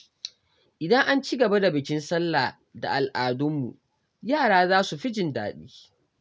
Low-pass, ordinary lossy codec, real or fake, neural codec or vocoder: none; none; real; none